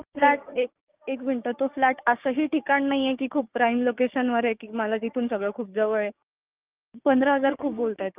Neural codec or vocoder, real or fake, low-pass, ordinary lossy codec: codec, 16 kHz, 6 kbps, DAC; fake; 3.6 kHz; Opus, 24 kbps